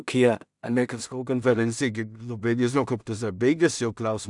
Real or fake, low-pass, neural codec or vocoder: fake; 10.8 kHz; codec, 16 kHz in and 24 kHz out, 0.4 kbps, LongCat-Audio-Codec, two codebook decoder